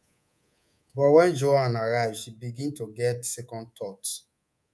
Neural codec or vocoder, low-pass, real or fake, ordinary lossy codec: codec, 24 kHz, 3.1 kbps, DualCodec; none; fake; none